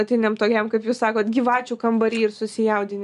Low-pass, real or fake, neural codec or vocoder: 10.8 kHz; real; none